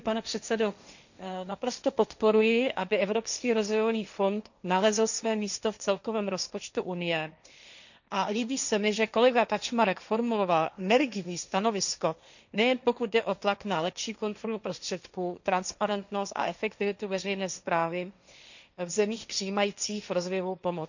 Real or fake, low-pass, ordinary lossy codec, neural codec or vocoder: fake; 7.2 kHz; none; codec, 16 kHz, 1.1 kbps, Voila-Tokenizer